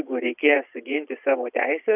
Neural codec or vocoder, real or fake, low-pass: vocoder, 22.05 kHz, 80 mel bands, Vocos; fake; 3.6 kHz